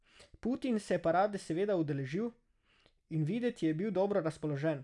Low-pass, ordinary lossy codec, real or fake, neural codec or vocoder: 10.8 kHz; none; real; none